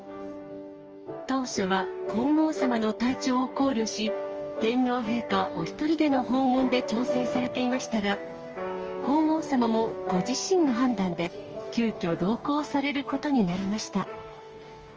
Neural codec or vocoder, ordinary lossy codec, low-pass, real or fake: codec, 44.1 kHz, 2.6 kbps, DAC; Opus, 24 kbps; 7.2 kHz; fake